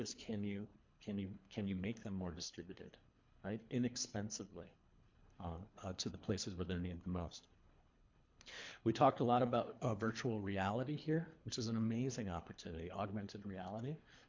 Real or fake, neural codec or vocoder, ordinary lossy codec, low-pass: fake; codec, 24 kHz, 3 kbps, HILCodec; MP3, 48 kbps; 7.2 kHz